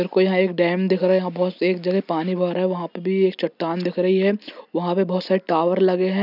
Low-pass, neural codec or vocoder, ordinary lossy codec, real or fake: 5.4 kHz; none; none; real